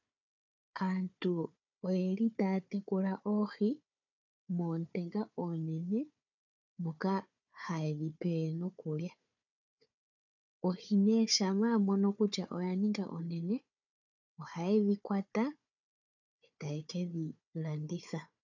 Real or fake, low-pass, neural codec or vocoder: fake; 7.2 kHz; codec, 16 kHz, 4 kbps, FunCodec, trained on Chinese and English, 50 frames a second